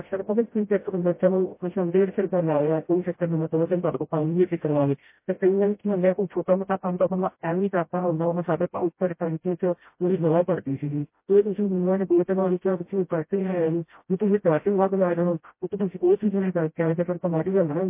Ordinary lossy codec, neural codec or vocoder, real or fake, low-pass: MP3, 24 kbps; codec, 16 kHz, 0.5 kbps, FreqCodec, smaller model; fake; 3.6 kHz